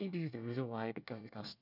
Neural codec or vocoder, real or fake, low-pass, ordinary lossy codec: codec, 24 kHz, 1 kbps, SNAC; fake; 5.4 kHz; none